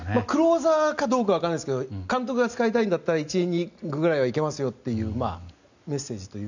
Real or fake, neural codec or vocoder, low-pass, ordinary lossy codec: real; none; 7.2 kHz; none